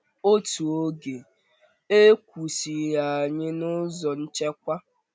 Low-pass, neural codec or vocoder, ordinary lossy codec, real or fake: none; none; none; real